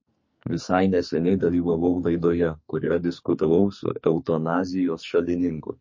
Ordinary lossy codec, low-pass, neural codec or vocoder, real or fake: MP3, 32 kbps; 7.2 kHz; codec, 44.1 kHz, 2.6 kbps, SNAC; fake